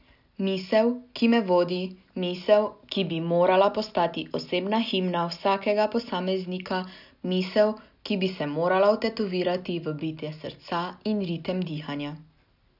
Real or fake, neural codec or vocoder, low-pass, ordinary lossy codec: real; none; 5.4 kHz; none